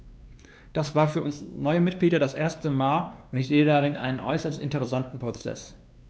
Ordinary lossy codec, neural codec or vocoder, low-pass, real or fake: none; codec, 16 kHz, 2 kbps, X-Codec, WavLM features, trained on Multilingual LibriSpeech; none; fake